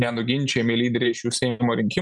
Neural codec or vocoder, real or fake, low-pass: none; real; 10.8 kHz